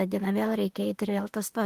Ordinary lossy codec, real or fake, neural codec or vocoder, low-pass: Opus, 24 kbps; fake; autoencoder, 48 kHz, 32 numbers a frame, DAC-VAE, trained on Japanese speech; 14.4 kHz